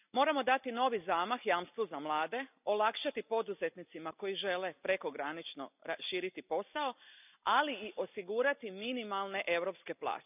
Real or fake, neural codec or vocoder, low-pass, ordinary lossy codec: real; none; 3.6 kHz; none